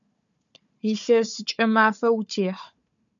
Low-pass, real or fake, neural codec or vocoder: 7.2 kHz; fake; codec, 16 kHz, 4 kbps, FunCodec, trained on Chinese and English, 50 frames a second